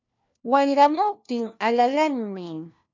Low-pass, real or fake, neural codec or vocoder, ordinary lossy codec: 7.2 kHz; fake; codec, 16 kHz, 1 kbps, FunCodec, trained on LibriTTS, 50 frames a second; MP3, 64 kbps